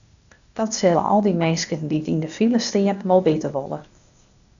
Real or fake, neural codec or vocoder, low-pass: fake; codec, 16 kHz, 0.8 kbps, ZipCodec; 7.2 kHz